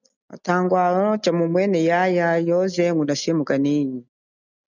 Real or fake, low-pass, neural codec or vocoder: real; 7.2 kHz; none